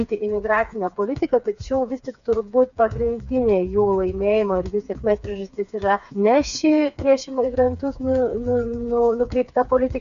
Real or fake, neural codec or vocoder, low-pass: fake; codec, 16 kHz, 4 kbps, FreqCodec, smaller model; 7.2 kHz